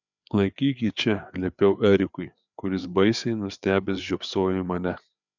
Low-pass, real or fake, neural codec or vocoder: 7.2 kHz; fake; codec, 16 kHz, 4 kbps, FreqCodec, larger model